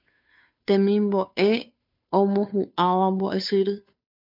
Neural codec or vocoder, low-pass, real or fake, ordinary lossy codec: codec, 16 kHz, 2 kbps, FunCodec, trained on Chinese and English, 25 frames a second; 5.4 kHz; fake; MP3, 48 kbps